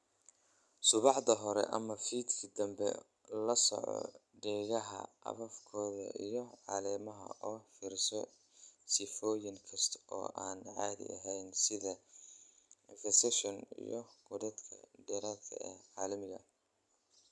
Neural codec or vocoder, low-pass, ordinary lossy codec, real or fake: none; none; none; real